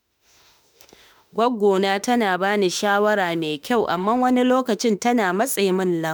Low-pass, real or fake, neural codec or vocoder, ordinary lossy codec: none; fake; autoencoder, 48 kHz, 32 numbers a frame, DAC-VAE, trained on Japanese speech; none